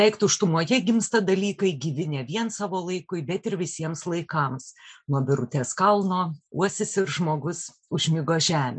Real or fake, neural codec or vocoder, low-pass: real; none; 9.9 kHz